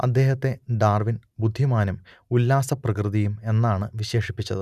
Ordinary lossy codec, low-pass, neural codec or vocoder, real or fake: none; 14.4 kHz; none; real